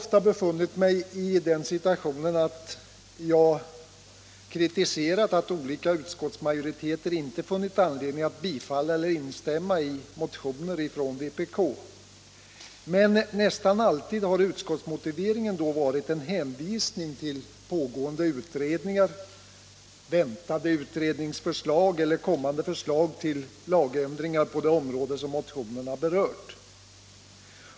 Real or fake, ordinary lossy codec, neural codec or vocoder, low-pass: real; none; none; none